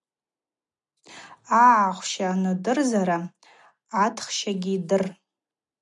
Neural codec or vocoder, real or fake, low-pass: none; real; 10.8 kHz